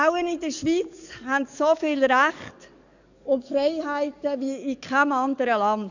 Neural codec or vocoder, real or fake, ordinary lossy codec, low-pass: codec, 44.1 kHz, 7.8 kbps, DAC; fake; none; 7.2 kHz